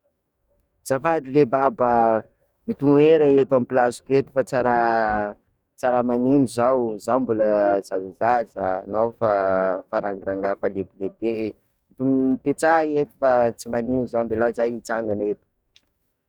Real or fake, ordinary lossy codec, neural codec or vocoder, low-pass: fake; none; codec, 44.1 kHz, 2.6 kbps, DAC; 19.8 kHz